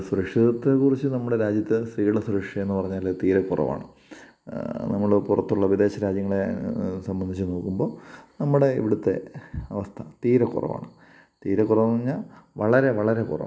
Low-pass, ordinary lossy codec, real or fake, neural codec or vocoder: none; none; real; none